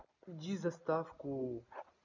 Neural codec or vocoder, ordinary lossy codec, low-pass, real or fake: none; none; 7.2 kHz; real